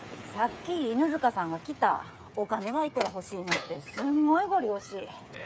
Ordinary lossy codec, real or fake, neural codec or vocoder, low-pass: none; fake; codec, 16 kHz, 8 kbps, FreqCodec, smaller model; none